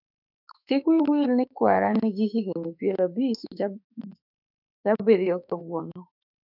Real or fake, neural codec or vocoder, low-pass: fake; autoencoder, 48 kHz, 32 numbers a frame, DAC-VAE, trained on Japanese speech; 5.4 kHz